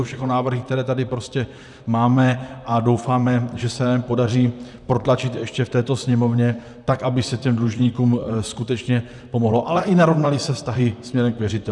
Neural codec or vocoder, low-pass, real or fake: vocoder, 44.1 kHz, 128 mel bands, Pupu-Vocoder; 10.8 kHz; fake